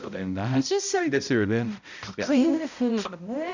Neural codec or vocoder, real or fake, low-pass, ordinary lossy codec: codec, 16 kHz, 0.5 kbps, X-Codec, HuBERT features, trained on balanced general audio; fake; 7.2 kHz; none